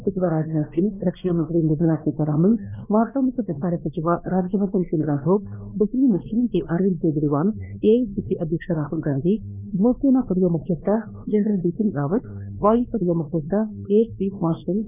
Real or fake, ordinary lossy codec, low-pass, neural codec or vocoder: fake; none; 3.6 kHz; codec, 16 kHz, 2 kbps, X-Codec, WavLM features, trained on Multilingual LibriSpeech